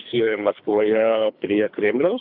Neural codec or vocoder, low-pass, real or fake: codec, 24 kHz, 3 kbps, HILCodec; 5.4 kHz; fake